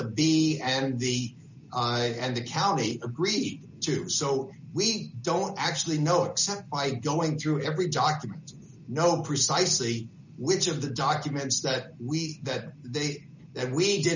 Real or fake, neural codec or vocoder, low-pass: real; none; 7.2 kHz